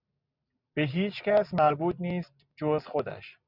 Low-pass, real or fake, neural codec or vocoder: 5.4 kHz; real; none